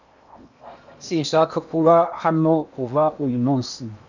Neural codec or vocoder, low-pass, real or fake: codec, 16 kHz in and 24 kHz out, 0.8 kbps, FocalCodec, streaming, 65536 codes; 7.2 kHz; fake